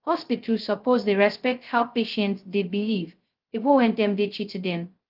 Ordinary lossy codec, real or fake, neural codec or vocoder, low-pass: Opus, 32 kbps; fake; codec, 16 kHz, 0.2 kbps, FocalCodec; 5.4 kHz